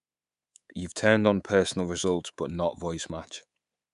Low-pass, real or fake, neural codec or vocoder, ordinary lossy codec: 10.8 kHz; fake; codec, 24 kHz, 3.1 kbps, DualCodec; none